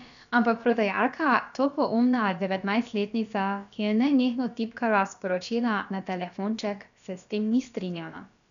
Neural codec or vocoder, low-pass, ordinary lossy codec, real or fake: codec, 16 kHz, about 1 kbps, DyCAST, with the encoder's durations; 7.2 kHz; none; fake